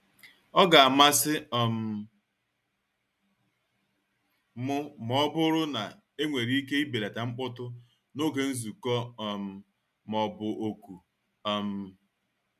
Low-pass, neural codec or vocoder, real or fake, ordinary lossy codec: 14.4 kHz; none; real; none